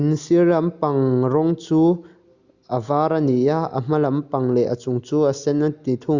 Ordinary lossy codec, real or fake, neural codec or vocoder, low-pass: Opus, 64 kbps; real; none; 7.2 kHz